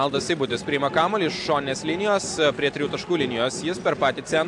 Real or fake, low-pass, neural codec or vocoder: real; 10.8 kHz; none